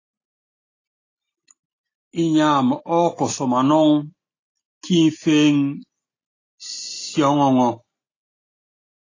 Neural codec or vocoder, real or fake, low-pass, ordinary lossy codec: none; real; 7.2 kHz; AAC, 32 kbps